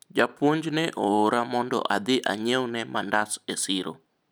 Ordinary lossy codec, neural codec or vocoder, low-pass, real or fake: none; none; none; real